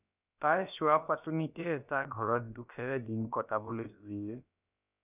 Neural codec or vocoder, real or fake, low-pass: codec, 16 kHz, about 1 kbps, DyCAST, with the encoder's durations; fake; 3.6 kHz